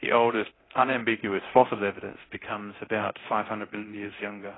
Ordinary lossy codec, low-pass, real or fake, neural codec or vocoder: AAC, 16 kbps; 7.2 kHz; fake; codec, 24 kHz, 0.9 kbps, DualCodec